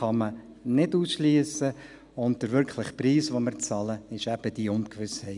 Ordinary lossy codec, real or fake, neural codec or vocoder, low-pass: none; real; none; 10.8 kHz